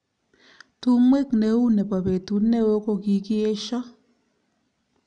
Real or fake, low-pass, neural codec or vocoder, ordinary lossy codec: real; 9.9 kHz; none; none